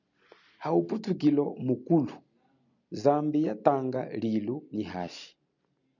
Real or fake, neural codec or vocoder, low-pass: real; none; 7.2 kHz